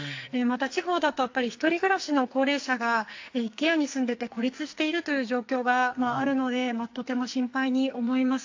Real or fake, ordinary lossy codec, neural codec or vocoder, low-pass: fake; AAC, 48 kbps; codec, 44.1 kHz, 2.6 kbps, SNAC; 7.2 kHz